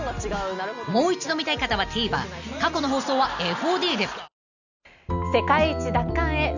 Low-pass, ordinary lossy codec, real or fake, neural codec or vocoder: 7.2 kHz; none; real; none